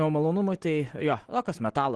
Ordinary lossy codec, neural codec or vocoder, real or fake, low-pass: Opus, 16 kbps; none; real; 10.8 kHz